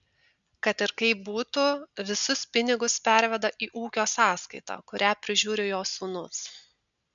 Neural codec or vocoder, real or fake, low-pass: none; real; 7.2 kHz